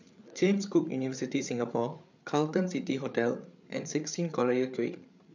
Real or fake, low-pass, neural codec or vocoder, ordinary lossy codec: fake; 7.2 kHz; codec, 16 kHz, 8 kbps, FreqCodec, larger model; none